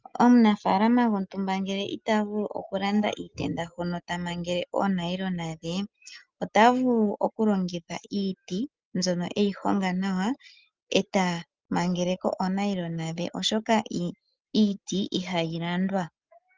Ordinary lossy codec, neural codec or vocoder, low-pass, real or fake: Opus, 32 kbps; none; 7.2 kHz; real